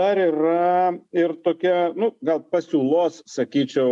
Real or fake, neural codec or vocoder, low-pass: real; none; 10.8 kHz